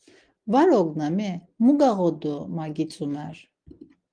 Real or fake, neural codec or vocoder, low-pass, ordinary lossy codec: real; none; 9.9 kHz; Opus, 24 kbps